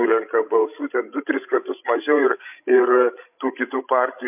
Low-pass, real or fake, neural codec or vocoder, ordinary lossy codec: 3.6 kHz; fake; codec, 16 kHz, 16 kbps, FreqCodec, larger model; MP3, 32 kbps